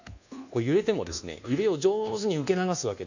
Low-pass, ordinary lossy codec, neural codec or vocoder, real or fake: 7.2 kHz; none; codec, 24 kHz, 1.2 kbps, DualCodec; fake